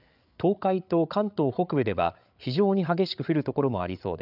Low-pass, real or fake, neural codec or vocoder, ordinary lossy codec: 5.4 kHz; fake; codec, 16 kHz, 8 kbps, FreqCodec, larger model; none